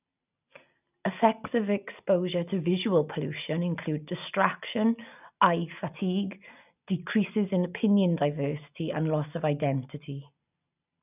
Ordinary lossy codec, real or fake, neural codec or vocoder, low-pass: none; real; none; 3.6 kHz